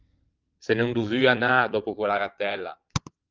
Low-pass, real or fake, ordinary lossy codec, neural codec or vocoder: 7.2 kHz; fake; Opus, 24 kbps; vocoder, 22.05 kHz, 80 mel bands, WaveNeXt